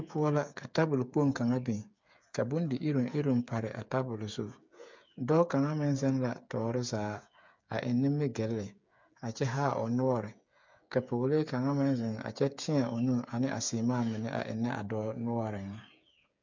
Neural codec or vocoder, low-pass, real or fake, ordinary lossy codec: codec, 16 kHz, 8 kbps, FreqCodec, smaller model; 7.2 kHz; fake; MP3, 64 kbps